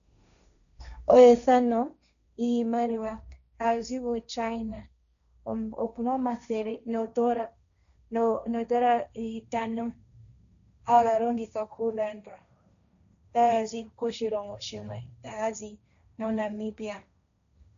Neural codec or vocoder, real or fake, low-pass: codec, 16 kHz, 1.1 kbps, Voila-Tokenizer; fake; 7.2 kHz